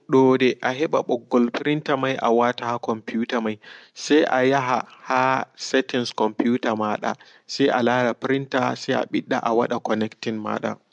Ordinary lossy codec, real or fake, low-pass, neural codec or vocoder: MP3, 64 kbps; real; 10.8 kHz; none